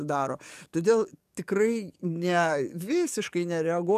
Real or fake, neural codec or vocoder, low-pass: fake; codec, 44.1 kHz, 7.8 kbps, DAC; 14.4 kHz